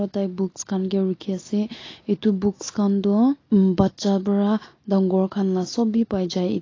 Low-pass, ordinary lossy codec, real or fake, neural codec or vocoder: 7.2 kHz; AAC, 32 kbps; real; none